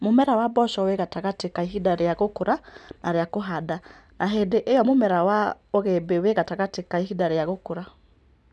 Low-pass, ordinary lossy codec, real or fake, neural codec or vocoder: none; none; real; none